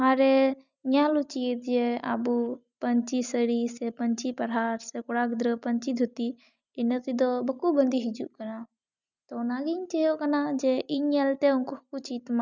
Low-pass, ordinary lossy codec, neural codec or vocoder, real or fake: 7.2 kHz; none; none; real